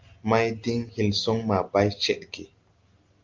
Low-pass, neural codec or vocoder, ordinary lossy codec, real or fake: 7.2 kHz; none; Opus, 24 kbps; real